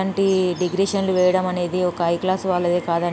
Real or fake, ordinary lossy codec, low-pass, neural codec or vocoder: real; none; none; none